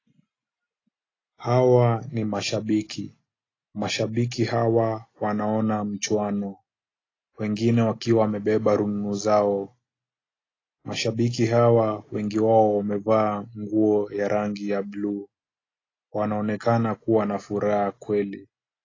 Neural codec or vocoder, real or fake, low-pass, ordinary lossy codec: none; real; 7.2 kHz; AAC, 32 kbps